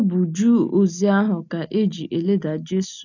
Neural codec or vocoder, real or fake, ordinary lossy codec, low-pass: none; real; none; 7.2 kHz